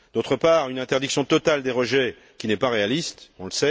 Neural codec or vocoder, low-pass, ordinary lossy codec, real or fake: none; none; none; real